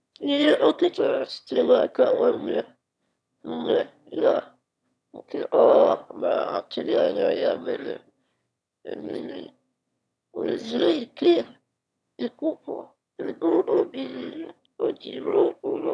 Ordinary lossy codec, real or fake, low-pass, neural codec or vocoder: none; fake; none; autoencoder, 22.05 kHz, a latent of 192 numbers a frame, VITS, trained on one speaker